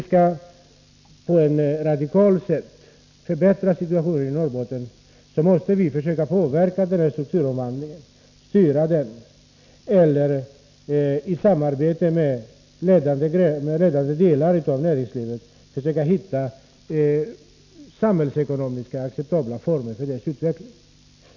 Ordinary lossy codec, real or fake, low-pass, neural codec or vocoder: none; real; 7.2 kHz; none